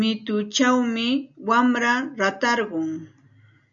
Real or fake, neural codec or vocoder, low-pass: real; none; 7.2 kHz